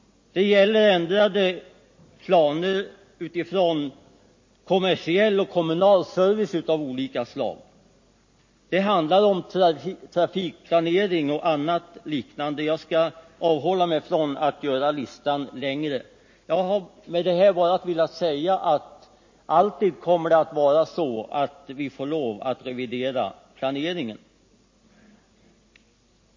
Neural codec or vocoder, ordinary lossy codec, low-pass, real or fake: none; MP3, 32 kbps; 7.2 kHz; real